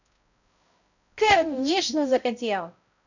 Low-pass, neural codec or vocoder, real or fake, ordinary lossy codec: 7.2 kHz; codec, 16 kHz, 0.5 kbps, X-Codec, HuBERT features, trained on balanced general audio; fake; none